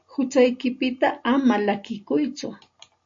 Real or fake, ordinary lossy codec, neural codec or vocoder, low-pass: real; AAC, 48 kbps; none; 7.2 kHz